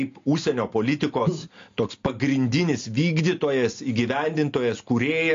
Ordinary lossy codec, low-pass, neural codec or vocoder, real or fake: AAC, 48 kbps; 7.2 kHz; none; real